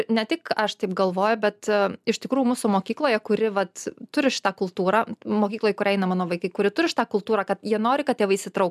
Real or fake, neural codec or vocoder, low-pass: real; none; 14.4 kHz